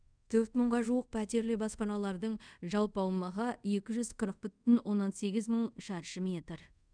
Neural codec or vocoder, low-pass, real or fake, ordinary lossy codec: codec, 24 kHz, 0.5 kbps, DualCodec; 9.9 kHz; fake; none